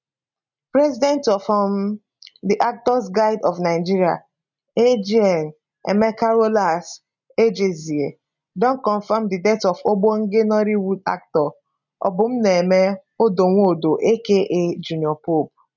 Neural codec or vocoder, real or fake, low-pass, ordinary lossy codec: none; real; 7.2 kHz; none